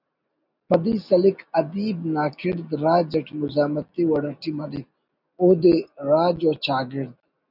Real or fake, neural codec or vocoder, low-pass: real; none; 5.4 kHz